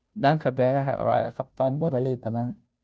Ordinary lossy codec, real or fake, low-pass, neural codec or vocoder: none; fake; none; codec, 16 kHz, 0.5 kbps, FunCodec, trained on Chinese and English, 25 frames a second